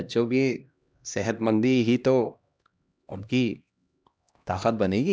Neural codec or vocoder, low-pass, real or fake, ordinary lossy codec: codec, 16 kHz, 1 kbps, X-Codec, HuBERT features, trained on LibriSpeech; none; fake; none